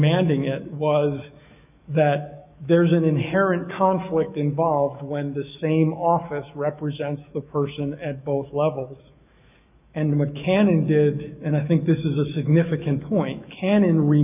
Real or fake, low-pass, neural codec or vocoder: fake; 3.6 kHz; autoencoder, 48 kHz, 128 numbers a frame, DAC-VAE, trained on Japanese speech